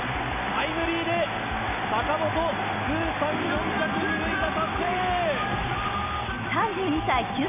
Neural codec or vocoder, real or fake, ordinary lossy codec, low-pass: none; real; none; 3.6 kHz